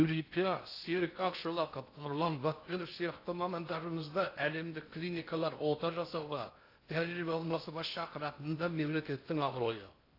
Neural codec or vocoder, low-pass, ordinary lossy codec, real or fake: codec, 16 kHz in and 24 kHz out, 0.6 kbps, FocalCodec, streaming, 2048 codes; 5.4 kHz; AAC, 32 kbps; fake